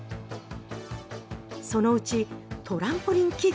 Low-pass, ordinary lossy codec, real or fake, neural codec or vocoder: none; none; real; none